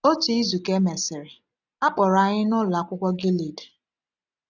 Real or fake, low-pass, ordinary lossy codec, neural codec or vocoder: real; 7.2 kHz; none; none